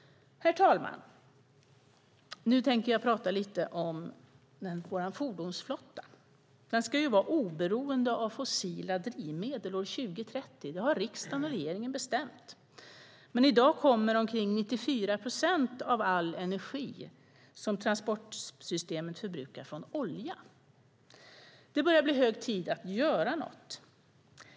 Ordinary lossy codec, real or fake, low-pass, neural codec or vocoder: none; real; none; none